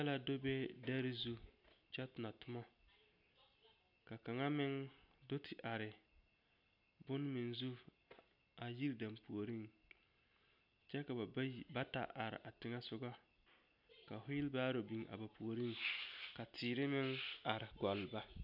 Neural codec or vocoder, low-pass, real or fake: none; 5.4 kHz; real